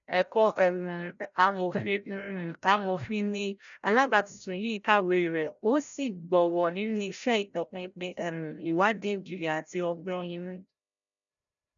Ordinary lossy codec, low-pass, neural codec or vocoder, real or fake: none; 7.2 kHz; codec, 16 kHz, 0.5 kbps, FreqCodec, larger model; fake